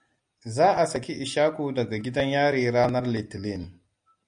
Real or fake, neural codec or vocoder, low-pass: real; none; 9.9 kHz